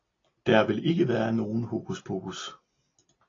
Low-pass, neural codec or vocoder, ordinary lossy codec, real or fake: 7.2 kHz; none; AAC, 32 kbps; real